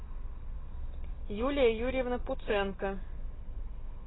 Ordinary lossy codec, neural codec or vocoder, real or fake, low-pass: AAC, 16 kbps; none; real; 7.2 kHz